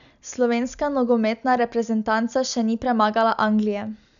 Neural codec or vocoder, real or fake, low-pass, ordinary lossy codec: none; real; 7.2 kHz; none